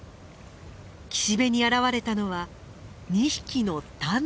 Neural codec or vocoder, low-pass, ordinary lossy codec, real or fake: none; none; none; real